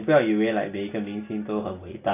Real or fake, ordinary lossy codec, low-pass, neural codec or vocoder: real; AAC, 24 kbps; 3.6 kHz; none